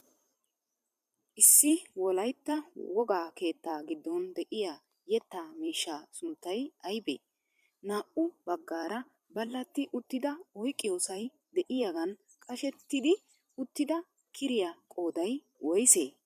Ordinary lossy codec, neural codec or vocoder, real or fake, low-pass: MP3, 96 kbps; vocoder, 44.1 kHz, 128 mel bands every 256 samples, BigVGAN v2; fake; 14.4 kHz